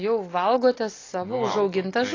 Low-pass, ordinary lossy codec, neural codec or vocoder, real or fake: 7.2 kHz; AAC, 32 kbps; none; real